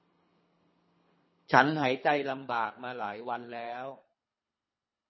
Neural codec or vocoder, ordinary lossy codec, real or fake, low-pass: codec, 24 kHz, 3 kbps, HILCodec; MP3, 24 kbps; fake; 7.2 kHz